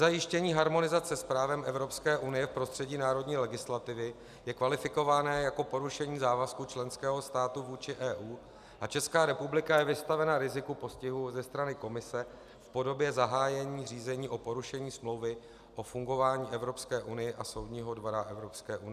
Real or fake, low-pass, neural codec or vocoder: real; 14.4 kHz; none